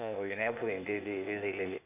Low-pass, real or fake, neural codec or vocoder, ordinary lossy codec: 3.6 kHz; fake; codec, 16 kHz, 0.8 kbps, ZipCodec; none